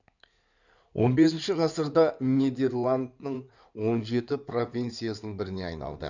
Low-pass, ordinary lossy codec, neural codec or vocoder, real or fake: 7.2 kHz; none; codec, 16 kHz in and 24 kHz out, 2.2 kbps, FireRedTTS-2 codec; fake